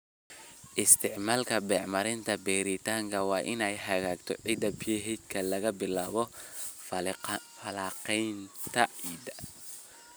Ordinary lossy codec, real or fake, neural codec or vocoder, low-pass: none; real; none; none